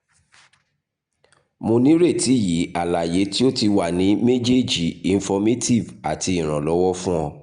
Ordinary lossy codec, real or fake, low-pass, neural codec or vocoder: Opus, 64 kbps; real; 9.9 kHz; none